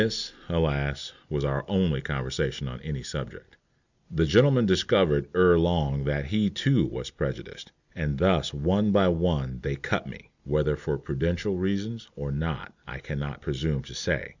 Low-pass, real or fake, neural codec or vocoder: 7.2 kHz; real; none